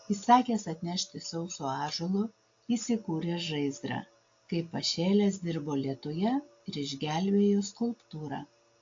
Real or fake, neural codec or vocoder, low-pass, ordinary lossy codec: real; none; 7.2 kHz; AAC, 48 kbps